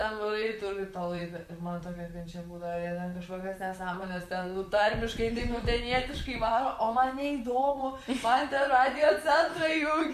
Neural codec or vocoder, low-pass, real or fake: vocoder, 44.1 kHz, 128 mel bands, Pupu-Vocoder; 14.4 kHz; fake